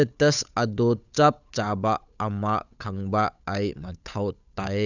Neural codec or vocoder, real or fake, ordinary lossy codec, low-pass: codec, 16 kHz, 8 kbps, FunCodec, trained on Chinese and English, 25 frames a second; fake; none; 7.2 kHz